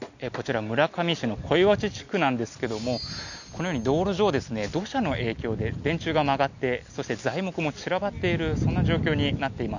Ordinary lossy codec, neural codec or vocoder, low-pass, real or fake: none; none; 7.2 kHz; real